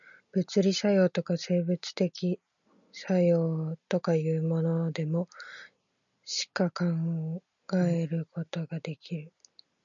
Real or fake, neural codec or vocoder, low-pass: real; none; 7.2 kHz